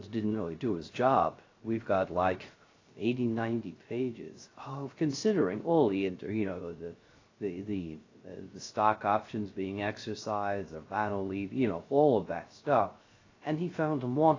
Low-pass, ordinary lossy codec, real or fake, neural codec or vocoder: 7.2 kHz; AAC, 32 kbps; fake; codec, 16 kHz, 0.3 kbps, FocalCodec